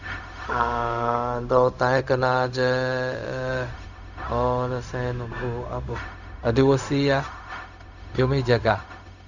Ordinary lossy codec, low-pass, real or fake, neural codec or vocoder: none; 7.2 kHz; fake; codec, 16 kHz, 0.4 kbps, LongCat-Audio-Codec